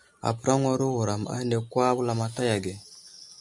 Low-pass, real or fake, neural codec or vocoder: 10.8 kHz; real; none